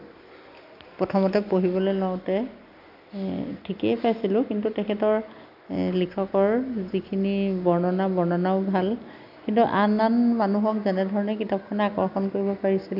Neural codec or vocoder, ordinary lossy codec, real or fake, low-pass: none; none; real; 5.4 kHz